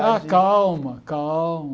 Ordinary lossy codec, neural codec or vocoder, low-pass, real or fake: none; none; none; real